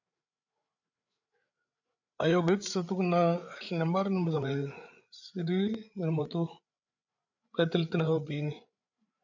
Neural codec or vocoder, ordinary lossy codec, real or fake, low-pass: codec, 16 kHz, 8 kbps, FreqCodec, larger model; MP3, 64 kbps; fake; 7.2 kHz